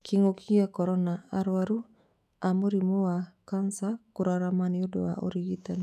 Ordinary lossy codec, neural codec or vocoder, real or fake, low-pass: none; autoencoder, 48 kHz, 128 numbers a frame, DAC-VAE, trained on Japanese speech; fake; 14.4 kHz